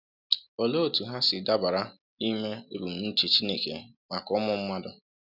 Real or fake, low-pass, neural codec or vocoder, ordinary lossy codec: real; 5.4 kHz; none; none